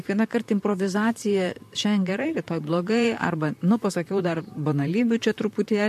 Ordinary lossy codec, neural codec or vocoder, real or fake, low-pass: MP3, 64 kbps; vocoder, 44.1 kHz, 128 mel bands, Pupu-Vocoder; fake; 14.4 kHz